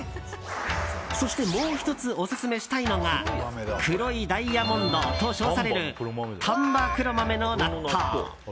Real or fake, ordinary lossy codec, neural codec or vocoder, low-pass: real; none; none; none